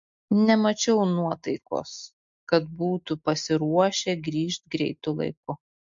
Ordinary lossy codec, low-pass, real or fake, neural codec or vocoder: MP3, 48 kbps; 7.2 kHz; real; none